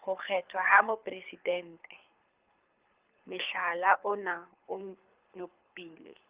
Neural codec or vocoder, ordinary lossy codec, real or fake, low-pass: codec, 24 kHz, 6 kbps, HILCodec; Opus, 32 kbps; fake; 3.6 kHz